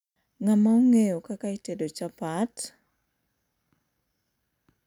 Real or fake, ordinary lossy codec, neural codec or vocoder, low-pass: real; none; none; 19.8 kHz